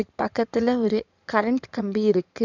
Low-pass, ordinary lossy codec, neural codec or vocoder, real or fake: 7.2 kHz; none; codec, 16 kHz in and 24 kHz out, 2.2 kbps, FireRedTTS-2 codec; fake